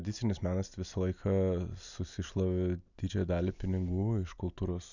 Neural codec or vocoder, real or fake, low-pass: none; real; 7.2 kHz